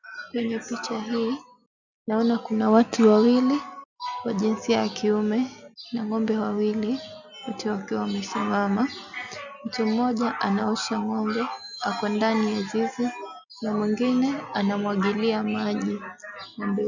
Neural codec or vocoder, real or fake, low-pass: none; real; 7.2 kHz